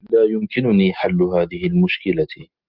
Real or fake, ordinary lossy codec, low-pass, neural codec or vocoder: real; Opus, 32 kbps; 5.4 kHz; none